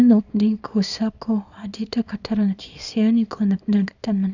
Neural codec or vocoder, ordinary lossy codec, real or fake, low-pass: codec, 24 kHz, 0.9 kbps, WavTokenizer, small release; none; fake; 7.2 kHz